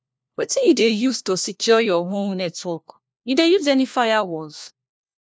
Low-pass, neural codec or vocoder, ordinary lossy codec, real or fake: none; codec, 16 kHz, 1 kbps, FunCodec, trained on LibriTTS, 50 frames a second; none; fake